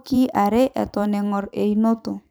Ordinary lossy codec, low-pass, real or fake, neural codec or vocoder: none; none; fake; vocoder, 44.1 kHz, 128 mel bands every 512 samples, BigVGAN v2